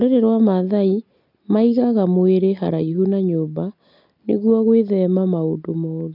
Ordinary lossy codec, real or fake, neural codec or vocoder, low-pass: none; real; none; 5.4 kHz